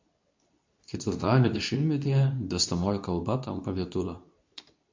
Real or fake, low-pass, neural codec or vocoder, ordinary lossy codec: fake; 7.2 kHz; codec, 24 kHz, 0.9 kbps, WavTokenizer, medium speech release version 2; MP3, 64 kbps